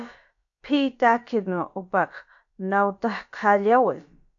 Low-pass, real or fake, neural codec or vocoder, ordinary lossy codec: 7.2 kHz; fake; codec, 16 kHz, about 1 kbps, DyCAST, with the encoder's durations; AAC, 64 kbps